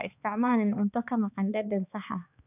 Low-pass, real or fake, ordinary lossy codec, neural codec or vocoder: 3.6 kHz; fake; none; codec, 16 kHz, 2 kbps, X-Codec, HuBERT features, trained on balanced general audio